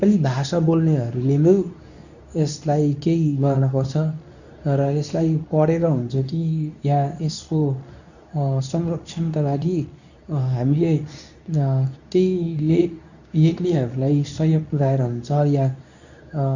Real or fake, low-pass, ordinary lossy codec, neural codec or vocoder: fake; 7.2 kHz; none; codec, 24 kHz, 0.9 kbps, WavTokenizer, medium speech release version 2